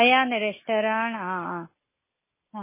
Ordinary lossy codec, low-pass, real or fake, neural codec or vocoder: MP3, 16 kbps; 3.6 kHz; real; none